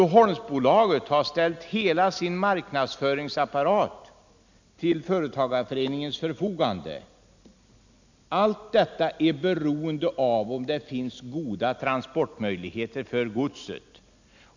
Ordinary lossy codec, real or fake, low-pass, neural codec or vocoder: none; real; 7.2 kHz; none